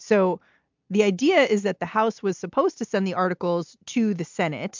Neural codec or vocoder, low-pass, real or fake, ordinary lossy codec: none; 7.2 kHz; real; MP3, 64 kbps